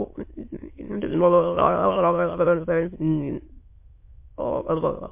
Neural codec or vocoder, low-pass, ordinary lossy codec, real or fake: autoencoder, 22.05 kHz, a latent of 192 numbers a frame, VITS, trained on many speakers; 3.6 kHz; MP3, 24 kbps; fake